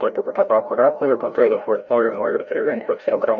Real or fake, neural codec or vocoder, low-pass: fake; codec, 16 kHz, 0.5 kbps, FreqCodec, larger model; 7.2 kHz